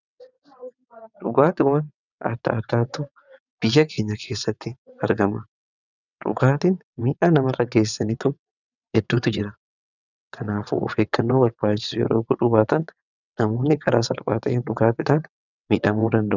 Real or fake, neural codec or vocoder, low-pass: fake; vocoder, 22.05 kHz, 80 mel bands, WaveNeXt; 7.2 kHz